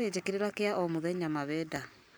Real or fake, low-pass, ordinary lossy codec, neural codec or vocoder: fake; none; none; vocoder, 44.1 kHz, 128 mel bands every 512 samples, BigVGAN v2